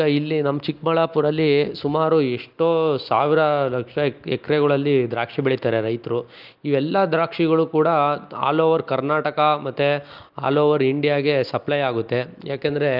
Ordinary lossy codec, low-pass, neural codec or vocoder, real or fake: Opus, 24 kbps; 5.4 kHz; none; real